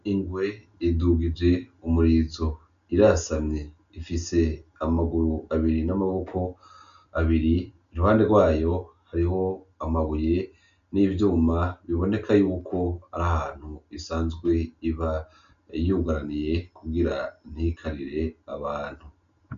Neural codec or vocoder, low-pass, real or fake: none; 7.2 kHz; real